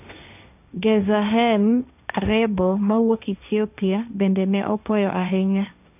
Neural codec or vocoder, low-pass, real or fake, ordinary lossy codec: codec, 16 kHz, 1.1 kbps, Voila-Tokenizer; 3.6 kHz; fake; none